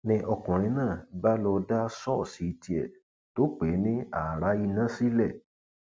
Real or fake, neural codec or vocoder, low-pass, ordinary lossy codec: real; none; none; none